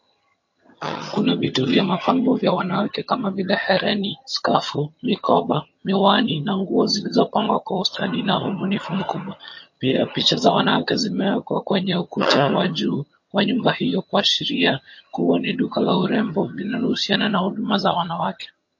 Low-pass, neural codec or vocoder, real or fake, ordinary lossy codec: 7.2 kHz; vocoder, 22.05 kHz, 80 mel bands, HiFi-GAN; fake; MP3, 32 kbps